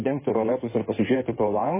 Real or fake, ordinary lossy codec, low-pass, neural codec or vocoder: fake; MP3, 16 kbps; 3.6 kHz; codec, 16 kHz in and 24 kHz out, 2.2 kbps, FireRedTTS-2 codec